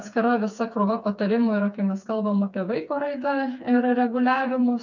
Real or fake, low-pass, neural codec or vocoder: fake; 7.2 kHz; codec, 16 kHz, 4 kbps, FreqCodec, smaller model